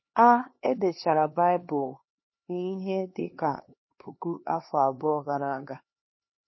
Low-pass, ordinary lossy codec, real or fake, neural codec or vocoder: 7.2 kHz; MP3, 24 kbps; fake; codec, 16 kHz, 2 kbps, X-Codec, HuBERT features, trained on LibriSpeech